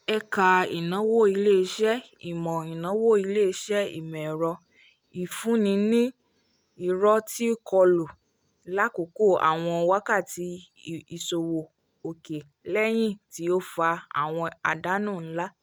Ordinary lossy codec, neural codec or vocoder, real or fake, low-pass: none; none; real; none